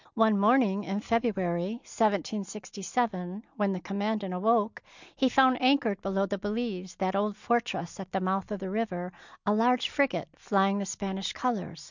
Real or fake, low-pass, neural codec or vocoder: real; 7.2 kHz; none